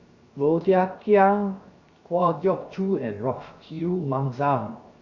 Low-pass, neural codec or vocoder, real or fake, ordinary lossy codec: 7.2 kHz; codec, 16 kHz, 0.7 kbps, FocalCodec; fake; Opus, 64 kbps